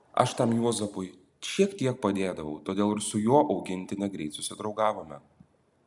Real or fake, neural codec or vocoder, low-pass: real; none; 10.8 kHz